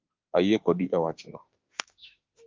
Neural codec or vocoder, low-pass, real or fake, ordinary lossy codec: autoencoder, 48 kHz, 32 numbers a frame, DAC-VAE, trained on Japanese speech; 7.2 kHz; fake; Opus, 32 kbps